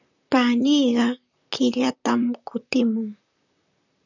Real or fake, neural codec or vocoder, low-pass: fake; vocoder, 44.1 kHz, 128 mel bands, Pupu-Vocoder; 7.2 kHz